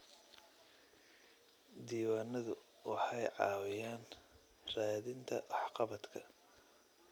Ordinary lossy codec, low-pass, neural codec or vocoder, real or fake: none; none; none; real